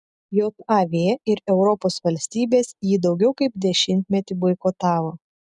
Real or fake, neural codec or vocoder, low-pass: real; none; 10.8 kHz